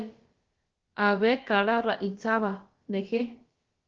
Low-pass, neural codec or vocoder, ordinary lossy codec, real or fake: 7.2 kHz; codec, 16 kHz, about 1 kbps, DyCAST, with the encoder's durations; Opus, 16 kbps; fake